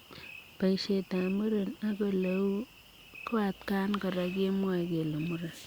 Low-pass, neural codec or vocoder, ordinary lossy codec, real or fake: 19.8 kHz; none; Opus, 64 kbps; real